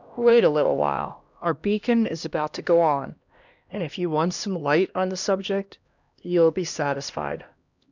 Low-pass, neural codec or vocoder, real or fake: 7.2 kHz; codec, 16 kHz, 1 kbps, X-Codec, HuBERT features, trained on LibriSpeech; fake